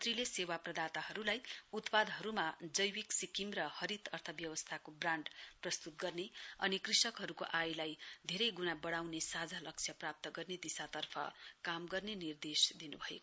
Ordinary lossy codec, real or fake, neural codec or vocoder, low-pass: none; real; none; none